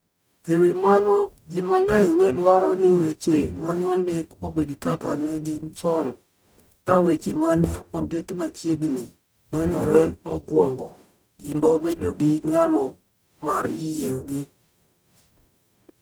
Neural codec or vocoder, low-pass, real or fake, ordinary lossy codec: codec, 44.1 kHz, 0.9 kbps, DAC; none; fake; none